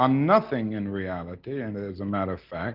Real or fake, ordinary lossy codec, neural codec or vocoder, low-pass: real; Opus, 24 kbps; none; 5.4 kHz